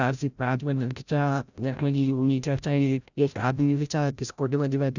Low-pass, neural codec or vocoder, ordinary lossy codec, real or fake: 7.2 kHz; codec, 16 kHz, 0.5 kbps, FreqCodec, larger model; none; fake